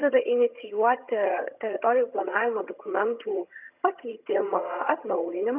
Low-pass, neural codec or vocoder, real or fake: 3.6 kHz; vocoder, 22.05 kHz, 80 mel bands, HiFi-GAN; fake